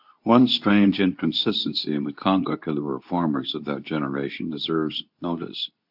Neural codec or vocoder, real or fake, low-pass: codec, 24 kHz, 0.9 kbps, WavTokenizer, medium speech release version 2; fake; 5.4 kHz